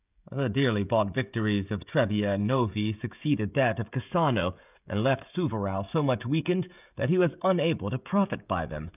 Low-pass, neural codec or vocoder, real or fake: 3.6 kHz; codec, 16 kHz, 16 kbps, FreqCodec, smaller model; fake